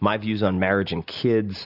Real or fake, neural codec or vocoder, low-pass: real; none; 5.4 kHz